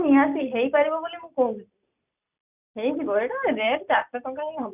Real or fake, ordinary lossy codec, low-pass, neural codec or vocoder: real; none; 3.6 kHz; none